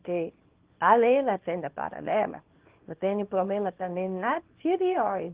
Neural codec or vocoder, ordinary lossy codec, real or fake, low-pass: codec, 24 kHz, 0.9 kbps, WavTokenizer, small release; Opus, 16 kbps; fake; 3.6 kHz